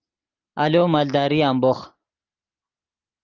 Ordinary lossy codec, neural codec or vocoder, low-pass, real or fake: Opus, 32 kbps; none; 7.2 kHz; real